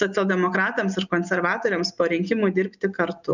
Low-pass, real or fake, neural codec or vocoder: 7.2 kHz; real; none